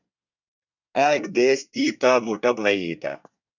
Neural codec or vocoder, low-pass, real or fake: codec, 24 kHz, 1 kbps, SNAC; 7.2 kHz; fake